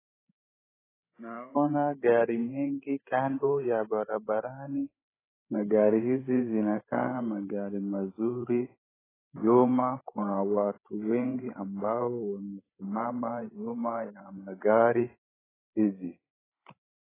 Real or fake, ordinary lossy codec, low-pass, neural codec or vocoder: fake; AAC, 16 kbps; 3.6 kHz; codec, 16 kHz, 8 kbps, FreqCodec, larger model